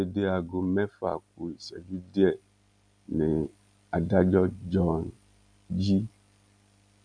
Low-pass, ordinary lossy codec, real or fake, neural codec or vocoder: 9.9 kHz; none; real; none